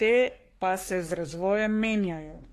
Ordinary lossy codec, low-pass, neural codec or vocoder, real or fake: AAC, 48 kbps; 14.4 kHz; codec, 44.1 kHz, 3.4 kbps, Pupu-Codec; fake